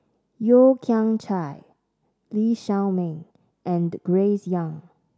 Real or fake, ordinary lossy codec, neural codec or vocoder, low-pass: real; none; none; none